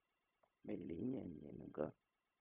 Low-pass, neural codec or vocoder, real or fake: 3.6 kHz; codec, 16 kHz, 0.4 kbps, LongCat-Audio-Codec; fake